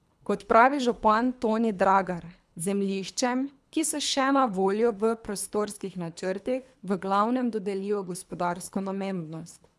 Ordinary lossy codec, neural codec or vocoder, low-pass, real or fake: none; codec, 24 kHz, 3 kbps, HILCodec; none; fake